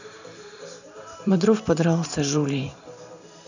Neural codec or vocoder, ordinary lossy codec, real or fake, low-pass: vocoder, 44.1 kHz, 128 mel bands every 512 samples, BigVGAN v2; none; fake; 7.2 kHz